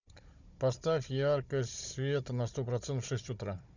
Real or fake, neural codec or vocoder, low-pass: fake; codec, 16 kHz, 16 kbps, FunCodec, trained on Chinese and English, 50 frames a second; 7.2 kHz